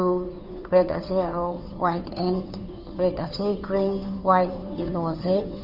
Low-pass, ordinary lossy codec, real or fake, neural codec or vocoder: 5.4 kHz; none; fake; codec, 16 kHz, 2 kbps, FunCodec, trained on Chinese and English, 25 frames a second